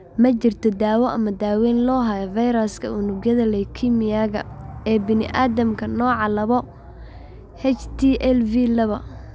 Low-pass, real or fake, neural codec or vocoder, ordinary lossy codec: none; real; none; none